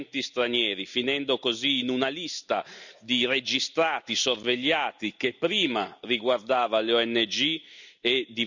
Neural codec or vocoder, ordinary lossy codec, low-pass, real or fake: none; none; 7.2 kHz; real